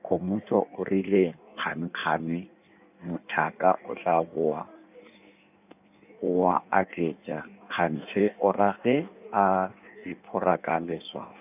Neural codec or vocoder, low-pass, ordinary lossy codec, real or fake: codec, 16 kHz in and 24 kHz out, 1.1 kbps, FireRedTTS-2 codec; 3.6 kHz; none; fake